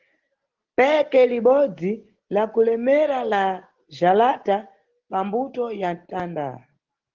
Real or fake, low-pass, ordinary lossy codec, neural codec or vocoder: real; 7.2 kHz; Opus, 16 kbps; none